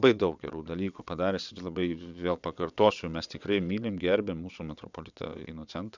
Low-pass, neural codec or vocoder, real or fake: 7.2 kHz; vocoder, 22.05 kHz, 80 mel bands, WaveNeXt; fake